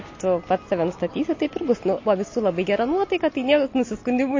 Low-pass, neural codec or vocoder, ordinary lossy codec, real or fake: 7.2 kHz; none; MP3, 32 kbps; real